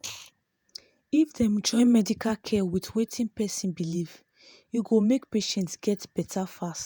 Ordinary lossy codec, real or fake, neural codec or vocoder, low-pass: none; fake; vocoder, 48 kHz, 128 mel bands, Vocos; none